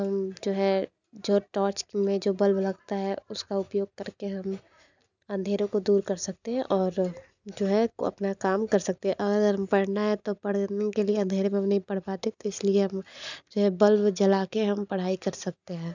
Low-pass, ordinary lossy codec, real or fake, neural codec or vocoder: 7.2 kHz; none; real; none